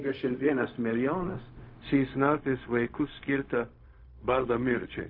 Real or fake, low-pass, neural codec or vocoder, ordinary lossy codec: fake; 5.4 kHz; codec, 16 kHz, 0.4 kbps, LongCat-Audio-Codec; MP3, 32 kbps